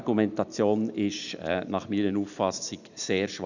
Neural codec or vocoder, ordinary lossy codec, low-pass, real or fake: none; none; 7.2 kHz; real